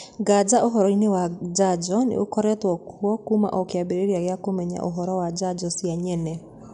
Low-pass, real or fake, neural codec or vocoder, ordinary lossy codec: 10.8 kHz; real; none; none